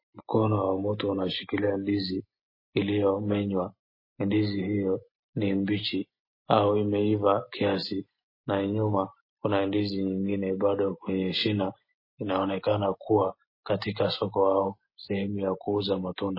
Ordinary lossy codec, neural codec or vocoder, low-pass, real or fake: MP3, 24 kbps; none; 5.4 kHz; real